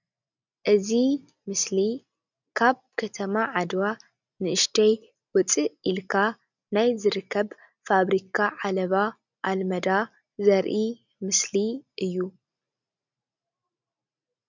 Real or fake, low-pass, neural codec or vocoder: real; 7.2 kHz; none